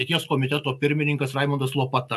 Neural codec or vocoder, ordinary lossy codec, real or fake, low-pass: none; AAC, 96 kbps; real; 14.4 kHz